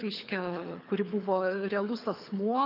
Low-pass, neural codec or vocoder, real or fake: 5.4 kHz; codec, 24 kHz, 6 kbps, HILCodec; fake